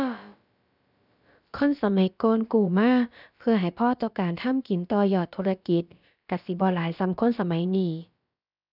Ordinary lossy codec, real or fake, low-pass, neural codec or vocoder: none; fake; 5.4 kHz; codec, 16 kHz, about 1 kbps, DyCAST, with the encoder's durations